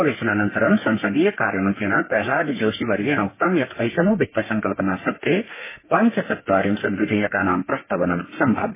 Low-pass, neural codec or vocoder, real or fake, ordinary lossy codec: 3.6 kHz; codec, 32 kHz, 1.9 kbps, SNAC; fake; MP3, 16 kbps